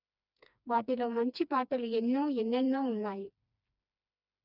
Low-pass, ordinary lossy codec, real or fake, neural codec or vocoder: 5.4 kHz; none; fake; codec, 16 kHz, 2 kbps, FreqCodec, smaller model